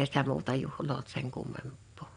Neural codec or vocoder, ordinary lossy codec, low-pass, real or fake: vocoder, 22.05 kHz, 80 mel bands, WaveNeXt; none; 9.9 kHz; fake